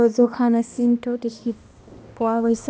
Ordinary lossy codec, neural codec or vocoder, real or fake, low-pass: none; codec, 16 kHz, 1 kbps, X-Codec, HuBERT features, trained on balanced general audio; fake; none